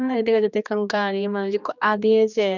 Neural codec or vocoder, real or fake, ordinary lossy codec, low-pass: codec, 16 kHz, 2 kbps, X-Codec, HuBERT features, trained on general audio; fake; none; 7.2 kHz